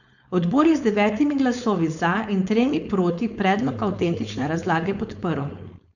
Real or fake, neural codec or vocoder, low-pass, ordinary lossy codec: fake; codec, 16 kHz, 4.8 kbps, FACodec; 7.2 kHz; none